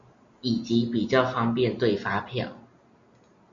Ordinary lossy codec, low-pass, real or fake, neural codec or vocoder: MP3, 48 kbps; 7.2 kHz; real; none